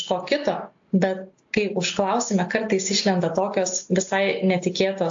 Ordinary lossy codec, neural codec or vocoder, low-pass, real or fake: AAC, 48 kbps; none; 7.2 kHz; real